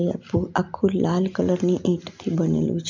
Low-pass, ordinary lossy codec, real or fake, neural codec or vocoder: 7.2 kHz; MP3, 64 kbps; real; none